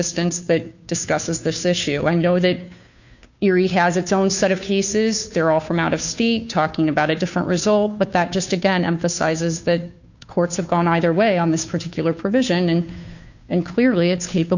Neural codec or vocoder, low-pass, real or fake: codec, 16 kHz, 2 kbps, FunCodec, trained on Chinese and English, 25 frames a second; 7.2 kHz; fake